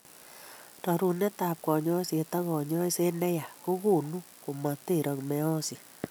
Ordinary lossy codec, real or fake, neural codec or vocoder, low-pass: none; real; none; none